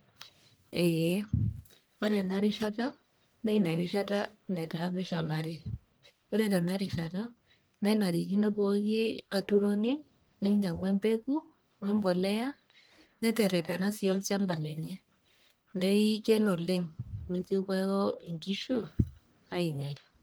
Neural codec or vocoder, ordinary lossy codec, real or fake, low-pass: codec, 44.1 kHz, 1.7 kbps, Pupu-Codec; none; fake; none